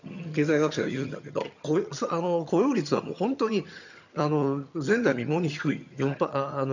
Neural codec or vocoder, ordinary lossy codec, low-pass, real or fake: vocoder, 22.05 kHz, 80 mel bands, HiFi-GAN; none; 7.2 kHz; fake